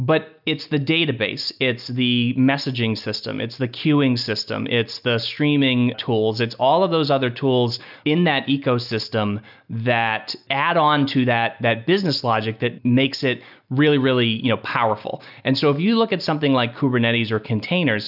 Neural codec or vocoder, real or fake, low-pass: none; real; 5.4 kHz